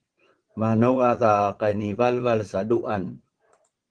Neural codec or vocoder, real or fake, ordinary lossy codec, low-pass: vocoder, 22.05 kHz, 80 mel bands, Vocos; fake; Opus, 16 kbps; 9.9 kHz